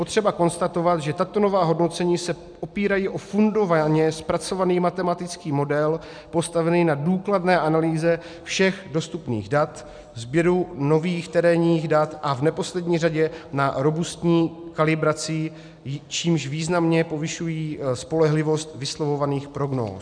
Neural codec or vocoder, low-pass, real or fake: none; 9.9 kHz; real